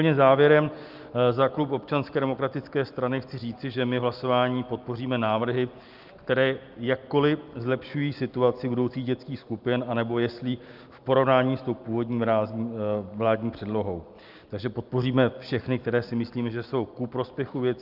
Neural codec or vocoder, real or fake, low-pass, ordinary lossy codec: none; real; 5.4 kHz; Opus, 24 kbps